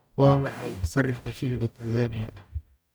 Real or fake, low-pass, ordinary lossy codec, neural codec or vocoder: fake; none; none; codec, 44.1 kHz, 0.9 kbps, DAC